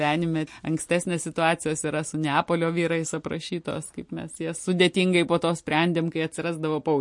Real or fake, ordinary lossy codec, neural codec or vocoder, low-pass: real; MP3, 48 kbps; none; 10.8 kHz